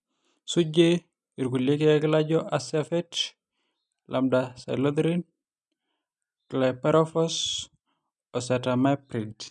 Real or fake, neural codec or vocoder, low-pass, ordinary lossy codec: real; none; 10.8 kHz; none